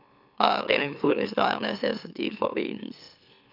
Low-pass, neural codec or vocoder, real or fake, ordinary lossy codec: 5.4 kHz; autoencoder, 44.1 kHz, a latent of 192 numbers a frame, MeloTTS; fake; none